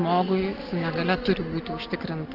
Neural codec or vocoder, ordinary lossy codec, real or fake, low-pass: none; Opus, 16 kbps; real; 5.4 kHz